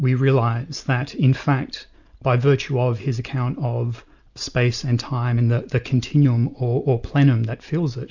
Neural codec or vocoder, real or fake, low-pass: none; real; 7.2 kHz